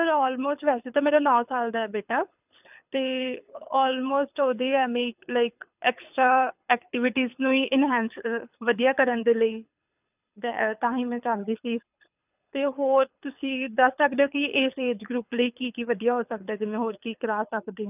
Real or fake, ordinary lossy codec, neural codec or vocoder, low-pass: fake; none; codec, 24 kHz, 6 kbps, HILCodec; 3.6 kHz